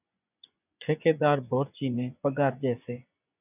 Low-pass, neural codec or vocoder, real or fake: 3.6 kHz; vocoder, 24 kHz, 100 mel bands, Vocos; fake